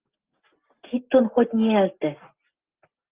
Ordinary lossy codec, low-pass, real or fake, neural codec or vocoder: Opus, 32 kbps; 3.6 kHz; real; none